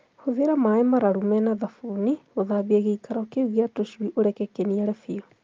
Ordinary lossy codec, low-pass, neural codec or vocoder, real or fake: Opus, 32 kbps; 7.2 kHz; none; real